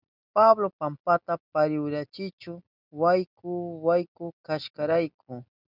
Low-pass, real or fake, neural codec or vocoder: 5.4 kHz; real; none